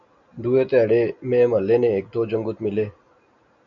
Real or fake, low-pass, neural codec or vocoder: real; 7.2 kHz; none